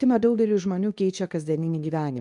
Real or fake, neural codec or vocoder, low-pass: fake; codec, 24 kHz, 0.9 kbps, WavTokenizer, medium speech release version 2; 10.8 kHz